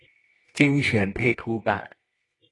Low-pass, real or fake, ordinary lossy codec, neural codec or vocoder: 10.8 kHz; fake; AAC, 32 kbps; codec, 24 kHz, 0.9 kbps, WavTokenizer, medium music audio release